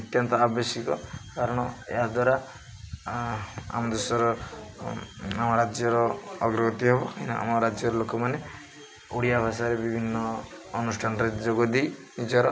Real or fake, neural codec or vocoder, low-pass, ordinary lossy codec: real; none; none; none